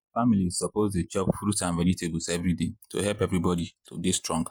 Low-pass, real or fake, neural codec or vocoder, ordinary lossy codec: none; fake; vocoder, 48 kHz, 128 mel bands, Vocos; none